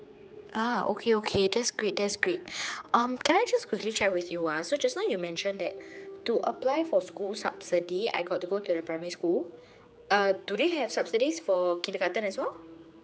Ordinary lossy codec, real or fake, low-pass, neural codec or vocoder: none; fake; none; codec, 16 kHz, 4 kbps, X-Codec, HuBERT features, trained on general audio